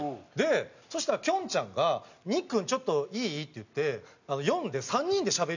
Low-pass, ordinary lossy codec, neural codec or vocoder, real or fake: 7.2 kHz; none; none; real